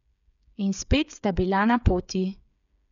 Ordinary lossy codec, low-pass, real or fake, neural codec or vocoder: none; 7.2 kHz; fake; codec, 16 kHz, 8 kbps, FreqCodec, smaller model